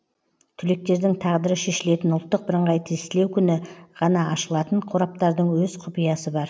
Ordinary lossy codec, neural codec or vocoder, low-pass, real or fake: none; none; none; real